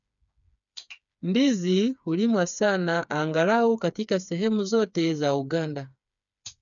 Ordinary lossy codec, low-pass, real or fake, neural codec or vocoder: none; 7.2 kHz; fake; codec, 16 kHz, 4 kbps, FreqCodec, smaller model